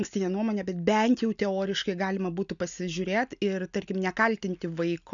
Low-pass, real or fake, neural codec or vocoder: 7.2 kHz; real; none